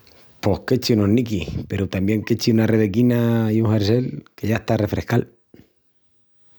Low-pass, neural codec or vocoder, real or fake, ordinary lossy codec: none; none; real; none